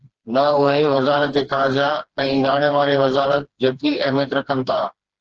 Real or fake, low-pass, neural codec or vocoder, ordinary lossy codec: fake; 7.2 kHz; codec, 16 kHz, 2 kbps, FreqCodec, smaller model; Opus, 16 kbps